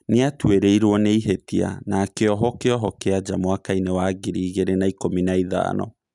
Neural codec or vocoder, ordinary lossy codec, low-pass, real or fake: none; none; 10.8 kHz; real